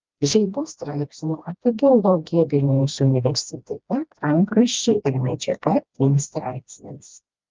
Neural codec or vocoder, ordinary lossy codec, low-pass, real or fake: codec, 16 kHz, 1 kbps, FreqCodec, smaller model; Opus, 24 kbps; 7.2 kHz; fake